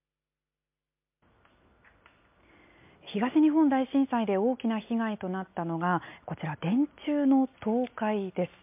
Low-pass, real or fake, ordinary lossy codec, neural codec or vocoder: 3.6 kHz; real; MP3, 32 kbps; none